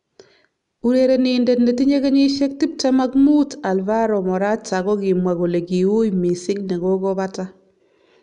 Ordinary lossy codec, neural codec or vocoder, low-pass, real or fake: none; none; 10.8 kHz; real